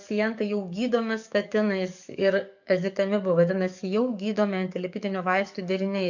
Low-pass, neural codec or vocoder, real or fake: 7.2 kHz; codec, 44.1 kHz, 7.8 kbps, DAC; fake